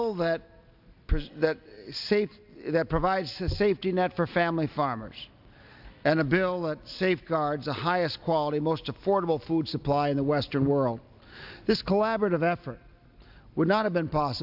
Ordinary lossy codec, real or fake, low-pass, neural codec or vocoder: AAC, 48 kbps; real; 5.4 kHz; none